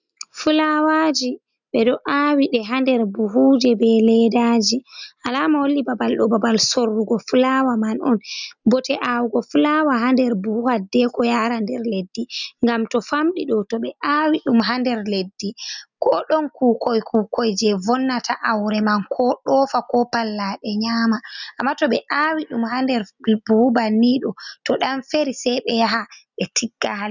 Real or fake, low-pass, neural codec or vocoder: real; 7.2 kHz; none